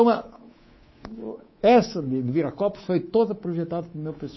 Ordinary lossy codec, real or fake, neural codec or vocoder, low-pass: MP3, 24 kbps; fake; codec, 24 kHz, 3.1 kbps, DualCodec; 7.2 kHz